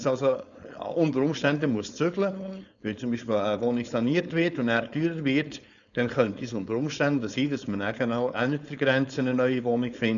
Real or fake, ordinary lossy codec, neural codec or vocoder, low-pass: fake; none; codec, 16 kHz, 4.8 kbps, FACodec; 7.2 kHz